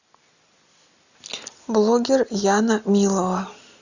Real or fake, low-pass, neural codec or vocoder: real; 7.2 kHz; none